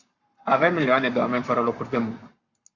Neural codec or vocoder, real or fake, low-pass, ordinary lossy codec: codec, 44.1 kHz, 7.8 kbps, Pupu-Codec; fake; 7.2 kHz; Opus, 64 kbps